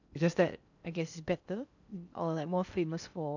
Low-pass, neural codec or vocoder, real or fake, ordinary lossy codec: 7.2 kHz; codec, 16 kHz in and 24 kHz out, 0.8 kbps, FocalCodec, streaming, 65536 codes; fake; none